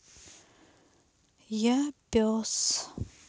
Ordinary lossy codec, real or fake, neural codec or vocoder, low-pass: none; real; none; none